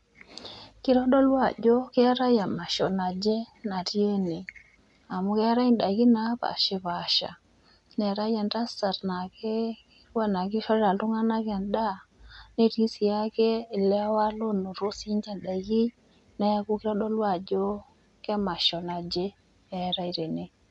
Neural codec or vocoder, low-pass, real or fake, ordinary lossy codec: none; 10.8 kHz; real; none